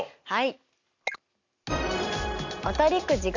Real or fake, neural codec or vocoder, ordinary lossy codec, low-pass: real; none; none; 7.2 kHz